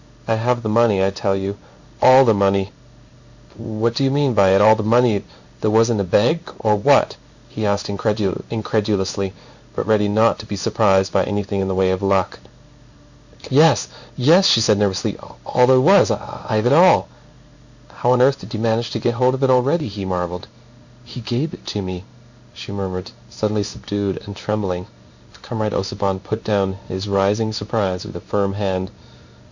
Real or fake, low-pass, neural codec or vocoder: fake; 7.2 kHz; codec, 16 kHz in and 24 kHz out, 1 kbps, XY-Tokenizer